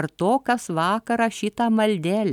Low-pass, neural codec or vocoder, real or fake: 19.8 kHz; none; real